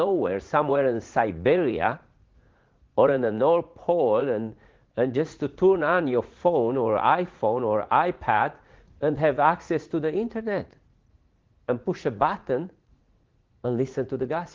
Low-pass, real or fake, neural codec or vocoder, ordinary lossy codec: 7.2 kHz; fake; vocoder, 44.1 kHz, 80 mel bands, Vocos; Opus, 16 kbps